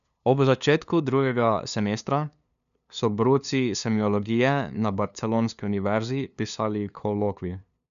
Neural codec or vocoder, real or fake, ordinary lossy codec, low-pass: codec, 16 kHz, 2 kbps, FunCodec, trained on LibriTTS, 25 frames a second; fake; none; 7.2 kHz